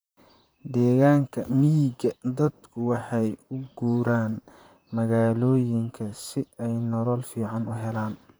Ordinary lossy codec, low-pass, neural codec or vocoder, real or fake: none; none; vocoder, 44.1 kHz, 128 mel bands, Pupu-Vocoder; fake